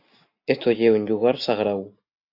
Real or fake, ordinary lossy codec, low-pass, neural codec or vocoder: real; AAC, 48 kbps; 5.4 kHz; none